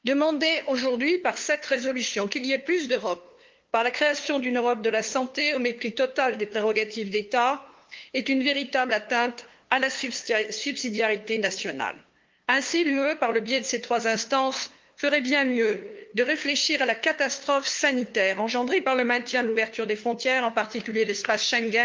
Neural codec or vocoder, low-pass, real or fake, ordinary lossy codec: codec, 16 kHz, 2 kbps, FunCodec, trained on LibriTTS, 25 frames a second; 7.2 kHz; fake; Opus, 32 kbps